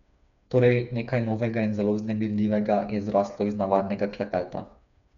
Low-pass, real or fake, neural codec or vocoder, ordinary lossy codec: 7.2 kHz; fake; codec, 16 kHz, 4 kbps, FreqCodec, smaller model; none